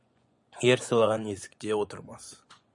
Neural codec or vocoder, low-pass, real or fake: vocoder, 24 kHz, 100 mel bands, Vocos; 10.8 kHz; fake